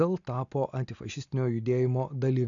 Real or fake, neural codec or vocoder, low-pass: real; none; 7.2 kHz